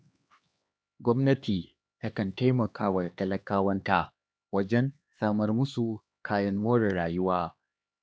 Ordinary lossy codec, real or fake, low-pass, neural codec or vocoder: none; fake; none; codec, 16 kHz, 2 kbps, X-Codec, HuBERT features, trained on LibriSpeech